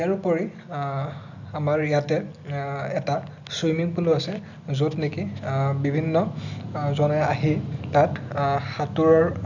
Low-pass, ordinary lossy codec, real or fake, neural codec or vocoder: 7.2 kHz; none; real; none